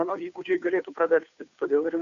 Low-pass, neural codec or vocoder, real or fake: 7.2 kHz; codec, 16 kHz, 2 kbps, FunCodec, trained on Chinese and English, 25 frames a second; fake